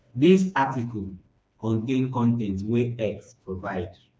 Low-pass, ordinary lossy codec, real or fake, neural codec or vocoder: none; none; fake; codec, 16 kHz, 2 kbps, FreqCodec, smaller model